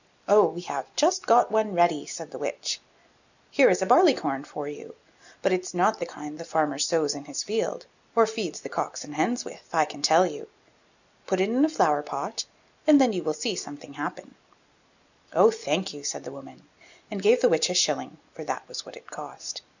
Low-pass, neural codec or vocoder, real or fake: 7.2 kHz; none; real